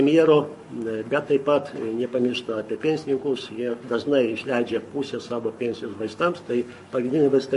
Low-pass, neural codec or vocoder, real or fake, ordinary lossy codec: 14.4 kHz; codec, 44.1 kHz, 7.8 kbps, Pupu-Codec; fake; MP3, 48 kbps